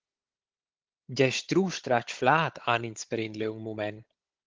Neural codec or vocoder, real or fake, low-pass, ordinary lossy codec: codec, 16 kHz, 16 kbps, FunCodec, trained on Chinese and English, 50 frames a second; fake; 7.2 kHz; Opus, 16 kbps